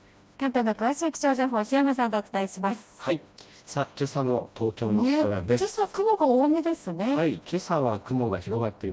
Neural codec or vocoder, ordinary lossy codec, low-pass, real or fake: codec, 16 kHz, 1 kbps, FreqCodec, smaller model; none; none; fake